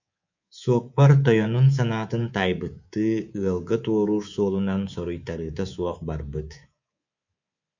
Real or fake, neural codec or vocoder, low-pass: fake; codec, 24 kHz, 3.1 kbps, DualCodec; 7.2 kHz